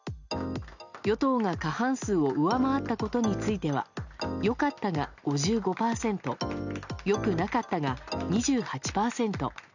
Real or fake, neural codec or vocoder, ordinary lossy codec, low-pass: real; none; none; 7.2 kHz